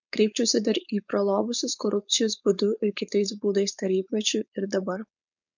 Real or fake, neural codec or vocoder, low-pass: fake; codec, 16 kHz, 4.8 kbps, FACodec; 7.2 kHz